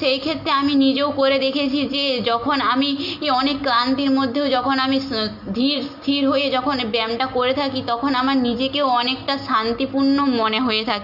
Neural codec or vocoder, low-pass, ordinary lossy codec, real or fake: none; 5.4 kHz; MP3, 48 kbps; real